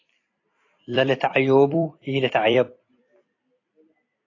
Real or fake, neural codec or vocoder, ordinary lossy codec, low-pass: fake; vocoder, 44.1 kHz, 128 mel bands every 256 samples, BigVGAN v2; AAC, 32 kbps; 7.2 kHz